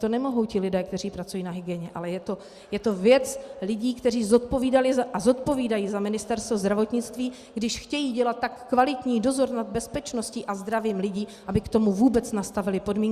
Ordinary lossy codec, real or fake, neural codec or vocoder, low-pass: Opus, 64 kbps; real; none; 14.4 kHz